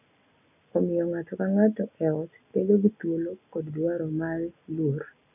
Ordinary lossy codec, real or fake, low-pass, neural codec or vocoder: none; real; 3.6 kHz; none